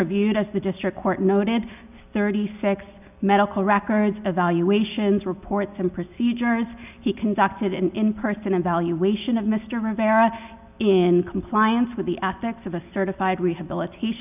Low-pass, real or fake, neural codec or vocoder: 3.6 kHz; real; none